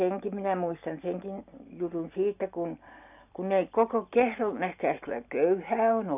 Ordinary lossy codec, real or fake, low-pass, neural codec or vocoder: none; real; 3.6 kHz; none